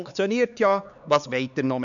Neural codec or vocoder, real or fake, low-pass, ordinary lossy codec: codec, 16 kHz, 4 kbps, X-Codec, HuBERT features, trained on LibriSpeech; fake; 7.2 kHz; none